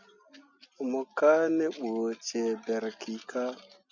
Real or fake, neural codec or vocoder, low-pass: real; none; 7.2 kHz